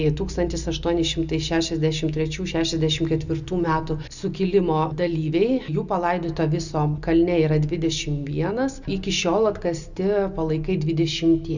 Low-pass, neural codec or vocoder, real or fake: 7.2 kHz; none; real